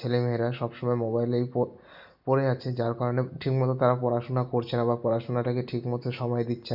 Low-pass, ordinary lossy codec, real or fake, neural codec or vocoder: 5.4 kHz; none; real; none